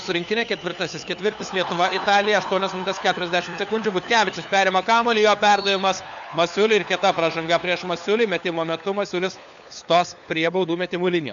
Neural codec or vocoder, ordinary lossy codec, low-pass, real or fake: codec, 16 kHz, 4 kbps, FunCodec, trained on LibriTTS, 50 frames a second; MP3, 96 kbps; 7.2 kHz; fake